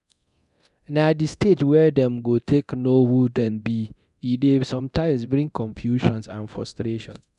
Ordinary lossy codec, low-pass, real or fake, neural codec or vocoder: none; 10.8 kHz; fake; codec, 24 kHz, 0.9 kbps, DualCodec